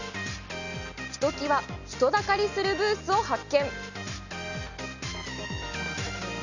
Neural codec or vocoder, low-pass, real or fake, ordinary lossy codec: none; 7.2 kHz; real; none